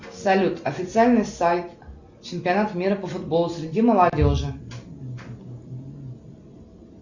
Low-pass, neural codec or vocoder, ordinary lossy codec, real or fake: 7.2 kHz; none; Opus, 64 kbps; real